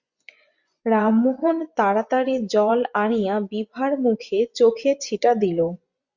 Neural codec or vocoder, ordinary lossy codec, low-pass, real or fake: vocoder, 24 kHz, 100 mel bands, Vocos; Opus, 64 kbps; 7.2 kHz; fake